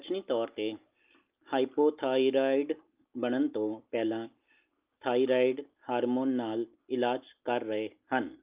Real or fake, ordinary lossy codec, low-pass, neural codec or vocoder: real; Opus, 24 kbps; 3.6 kHz; none